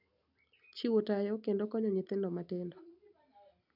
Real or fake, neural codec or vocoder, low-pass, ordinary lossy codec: real; none; 5.4 kHz; none